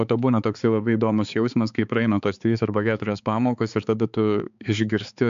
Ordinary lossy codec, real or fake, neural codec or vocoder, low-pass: MP3, 64 kbps; fake; codec, 16 kHz, 4 kbps, X-Codec, HuBERT features, trained on balanced general audio; 7.2 kHz